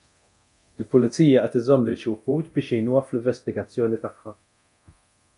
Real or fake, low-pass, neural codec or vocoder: fake; 10.8 kHz; codec, 24 kHz, 0.9 kbps, DualCodec